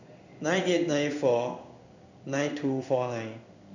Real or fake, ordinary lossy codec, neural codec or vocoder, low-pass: fake; none; codec, 16 kHz in and 24 kHz out, 1 kbps, XY-Tokenizer; 7.2 kHz